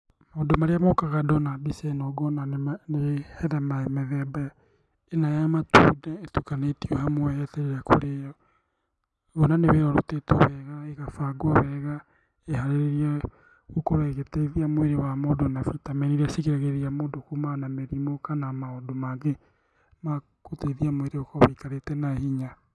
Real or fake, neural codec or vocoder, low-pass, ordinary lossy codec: real; none; none; none